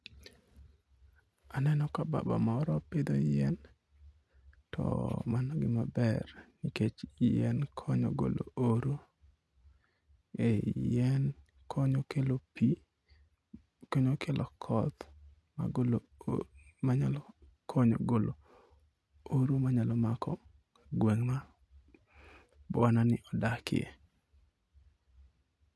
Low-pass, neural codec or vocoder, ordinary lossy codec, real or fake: none; none; none; real